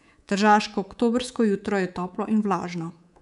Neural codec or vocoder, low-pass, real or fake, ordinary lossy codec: codec, 24 kHz, 3.1 kbps, DualCodec; 10.8 kHz; fake; none